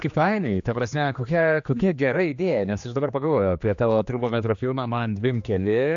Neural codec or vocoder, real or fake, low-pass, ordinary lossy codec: codec, 16 kHz, 2 kbps, X-Codec, HuBERT features, trained on general audio; fake; 7.2 kHz; AAC, 64 kbps